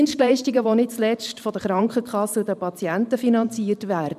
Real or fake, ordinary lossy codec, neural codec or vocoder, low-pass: fake; none; vocoder, 44.1 kHz, 128 mel bands every 512 samples, BigVGAN v2; 14.4 kHz